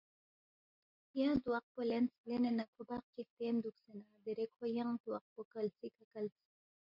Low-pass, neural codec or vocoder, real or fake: 5.4 kHz; none; real